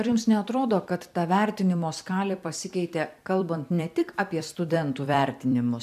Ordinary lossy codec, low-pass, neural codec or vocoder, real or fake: AAC, 96 kbps; 14.4 kHz; vocoder, 44.1 kHz, 128 mel bands every 256 samples, BigVGAN v2; fake